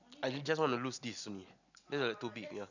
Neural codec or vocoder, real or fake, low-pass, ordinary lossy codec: none; real; 7.2 kHz; none